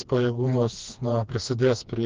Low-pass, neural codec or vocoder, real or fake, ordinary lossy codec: 7.2 kHz; codec, 16 kHz, 2 kbps, FreqCodec, smaller model; fake; Opus, 24 kbps